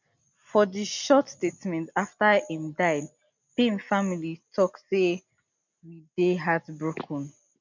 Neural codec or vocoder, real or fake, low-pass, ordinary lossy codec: none; real; 7.2 kHz; none